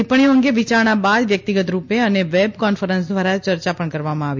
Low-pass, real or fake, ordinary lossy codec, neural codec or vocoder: 7.2 kHz; real; MP3, 48 kbps; none